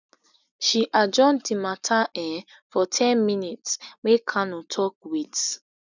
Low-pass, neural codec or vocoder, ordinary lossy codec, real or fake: 7.2 kHz; none; none; real